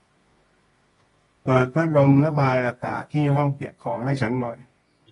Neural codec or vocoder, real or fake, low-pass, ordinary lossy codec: codec, 24 kHz, 0.9 kbps, WavTokenizer, medium music audio release; fake; 10.8 kHz; AAC, 32 kbps